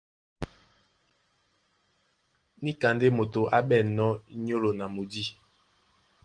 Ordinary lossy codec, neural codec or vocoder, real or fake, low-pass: Opus, 24 kbps; none; real; 9.9 kHz